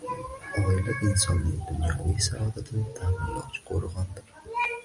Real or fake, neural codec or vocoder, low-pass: real; none; 10.8 kHz